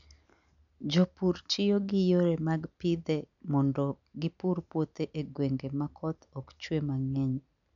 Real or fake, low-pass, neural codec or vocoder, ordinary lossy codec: real; 7.2 kHz; none; none